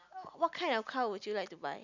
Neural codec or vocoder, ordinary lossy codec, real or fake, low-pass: none; none; real; 7.2 kHz